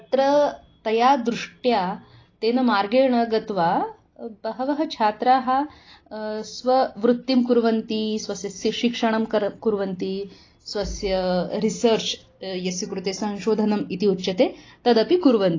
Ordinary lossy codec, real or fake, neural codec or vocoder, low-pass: AAC, 32 kbps; real; none; 7.2 kHz